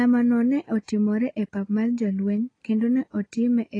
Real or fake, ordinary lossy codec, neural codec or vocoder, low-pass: real; AAC, 32 kbps; none; 9.9 kHz